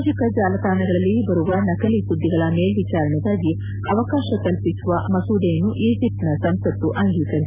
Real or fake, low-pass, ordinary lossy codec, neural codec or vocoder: real; 3.6 kHz; none; none